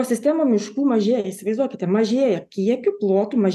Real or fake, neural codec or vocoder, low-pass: real; none; 14.4 kHz